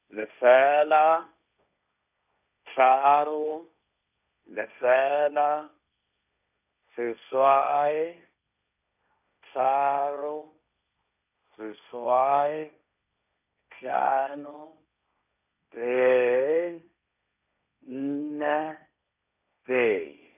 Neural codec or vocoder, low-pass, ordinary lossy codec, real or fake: codec, 16 kHz, 1.1 kbps, Voila-Tokenizer; 3.6 kHz; none; fake